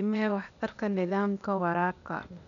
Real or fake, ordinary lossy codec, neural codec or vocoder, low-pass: fake; none; codec, 16 kHz, 0.8 kbps, ZipCodec; 7.2 kHz